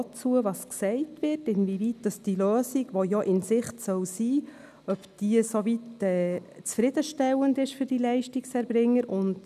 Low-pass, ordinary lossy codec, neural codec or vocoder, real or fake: 14.4 kHz; none; none; real